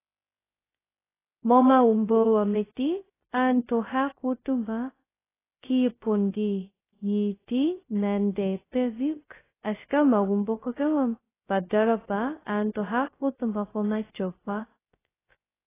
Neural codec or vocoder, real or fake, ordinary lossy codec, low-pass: codec, 16 kHz, 0.2 kbps, FocalCodec; fake; AAC, 16 kbps; 3.6 kHz